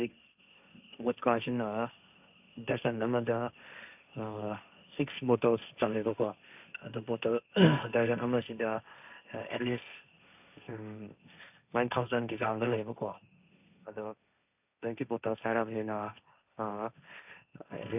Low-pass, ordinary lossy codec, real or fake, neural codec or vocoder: 3.6 kHz; none; fake; codec, 16 kHz, 1.1 kbps, Voila-Tokenizer